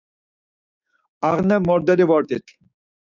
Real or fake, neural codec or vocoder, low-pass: fake; codec, 16 kHz, 6 kbps, DAC; 7.2 kHz